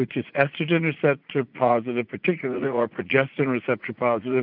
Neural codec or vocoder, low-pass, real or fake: vocoder, 44.1 kHz, 80 mel bands, Vocos; 5.4 kHz; fake